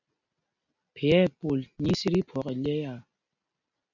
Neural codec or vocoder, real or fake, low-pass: none; real; 7.2 kHz